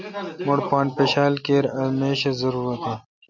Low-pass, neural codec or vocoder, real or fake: 7.2 kHz; none; real